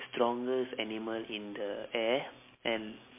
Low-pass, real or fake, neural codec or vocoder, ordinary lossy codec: 3.6 kHz; real; none; MP3, 24 kbps